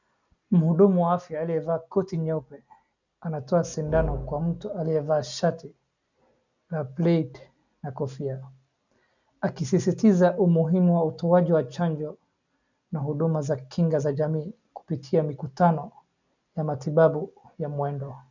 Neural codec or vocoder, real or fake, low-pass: none; real; 7.2 kHz